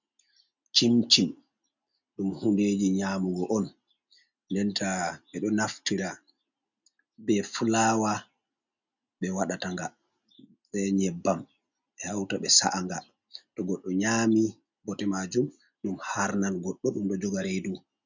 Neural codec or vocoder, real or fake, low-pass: none; real; 7.2 kHz